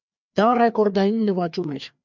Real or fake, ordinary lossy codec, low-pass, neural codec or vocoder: fake; MP3, 64 kbps; 7.2 kHz; codec, 16 kHz, 2 kbps, FreqCodec, larger model